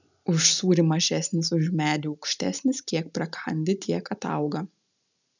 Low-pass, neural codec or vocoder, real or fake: 7.2 kHz; none; real